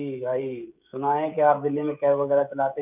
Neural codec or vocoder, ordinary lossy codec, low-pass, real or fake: codec, 16 kHz, 16 kbps, FreqCodec, smaller model; none; 3.6 kHz; fake